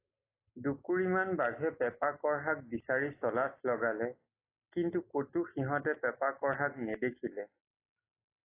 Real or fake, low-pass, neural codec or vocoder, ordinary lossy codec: real; 3.6 kHz; none; AAC, 24 kbps